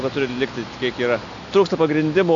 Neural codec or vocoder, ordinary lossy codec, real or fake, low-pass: none; AAC, 64 kbps; real; 7.2 kHz